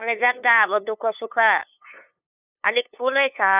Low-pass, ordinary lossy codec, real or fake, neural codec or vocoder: 3.6 kHz; none; fake; codec, 16 kHz, 2 kbps, FunCodec, trained on LibriTTS, 25 frames a second